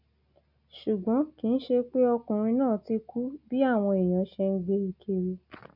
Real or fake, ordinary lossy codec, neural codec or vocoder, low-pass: real; none; none; 5.4 kHz